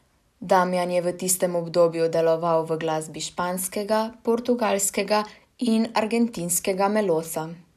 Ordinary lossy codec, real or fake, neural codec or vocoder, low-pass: none; real; none; 14.4 kHz